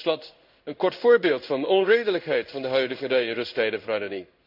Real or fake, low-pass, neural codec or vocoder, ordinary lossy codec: fake; 5.4 kHz; codec, 16 kHz in and 24 kHz out, 1 kbps, XY-Tokenizer; none